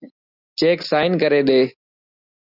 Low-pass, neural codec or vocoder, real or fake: 5.4 kHz; none; real